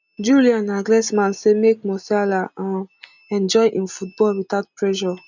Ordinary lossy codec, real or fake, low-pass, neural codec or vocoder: none; real; 7.2 kHz; none